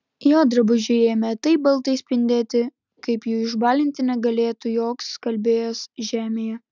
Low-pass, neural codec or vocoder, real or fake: 7.2 kHz; none; real